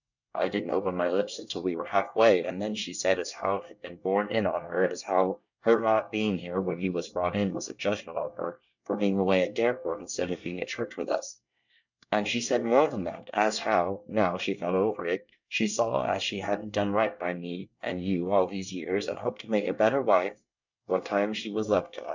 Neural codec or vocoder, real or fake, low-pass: codec, 24 kHz, 1 kbps, SNAC; fake; 7.2 kHz